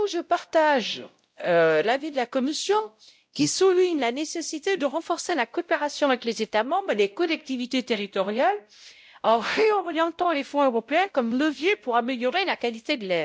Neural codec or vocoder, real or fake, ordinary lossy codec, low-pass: codec, 16 kHz, 0.5 kbps, X-Codec, WavLM features, trained on Multilingual LibriSpeech; fake; none; none